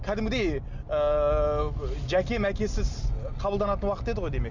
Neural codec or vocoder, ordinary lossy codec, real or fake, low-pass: none; none; real; 7.2 kHz